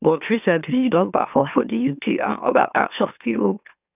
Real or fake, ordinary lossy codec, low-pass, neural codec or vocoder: fake; none; 3.6 kHz; autoencoder, 44.1 kHz, a latent of 192 numbers a frame, MeloTTS